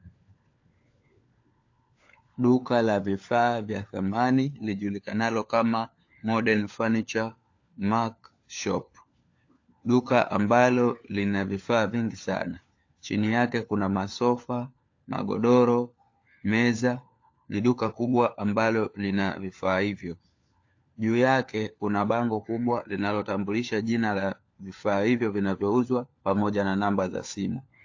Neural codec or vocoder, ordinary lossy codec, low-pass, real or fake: codec, 16 kHz, 4 kbps, FunCodec, trained on LibriTTS, 50 frames a second; AAC, 48 kbps; 7.2 kHz; fake